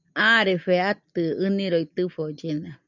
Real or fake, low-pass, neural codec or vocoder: real; 7.2 kHz; none